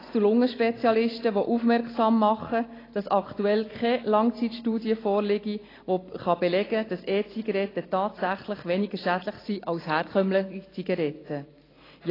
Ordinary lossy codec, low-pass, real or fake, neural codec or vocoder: AAC, 24 kbps; 5.4 kHz; real; none